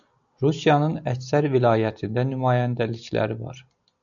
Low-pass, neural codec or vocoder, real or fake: 7.2 kHz; none; real